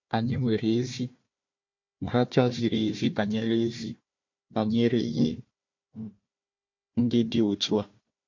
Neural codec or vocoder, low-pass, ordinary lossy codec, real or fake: codec, 16 kHz, 1 kbps, FunCodec, trained on Chinese and English, 50 frames a second; 7.2 kHz; MP3, 48 kbps; fake